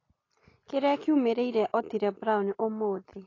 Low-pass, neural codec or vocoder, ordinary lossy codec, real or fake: 7.2 kHz; none; AAC, 32 kbps; real